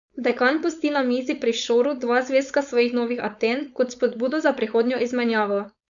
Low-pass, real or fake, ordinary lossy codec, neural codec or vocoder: 7.2 kHz; fake; none; codec, 16 kHz, 4.8 kbps, FACodec